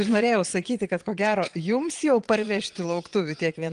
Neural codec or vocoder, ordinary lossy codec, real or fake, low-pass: vocoder, 22.05 kHz, 80 mel bands, Vocos; Opus, 24 kbps; fake; 9.9 kHz